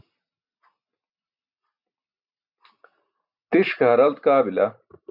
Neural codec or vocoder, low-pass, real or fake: none; 5.4 kHz; real